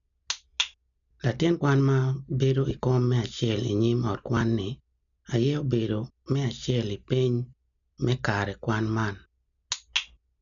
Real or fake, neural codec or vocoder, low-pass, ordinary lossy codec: real; none; 7.2 kHz; none